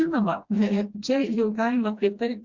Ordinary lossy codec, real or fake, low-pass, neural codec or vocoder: Opus, 64 kbps; fake; 7.2 kHz; codec, 16 kHz, 1 kbps, FreqCodec, smaller model